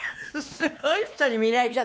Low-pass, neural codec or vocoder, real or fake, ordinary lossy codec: none; codec, 16 kHz, 2 kbps, X-Codec, WavLM features, trained on Multilingual LibriSpeech; fake; none